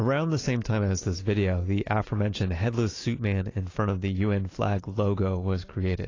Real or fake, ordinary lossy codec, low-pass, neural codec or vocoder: real; AAC, 32 kbps; 7.2 kHz; none